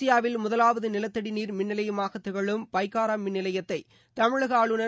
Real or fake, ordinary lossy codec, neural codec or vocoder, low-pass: real; none; none; none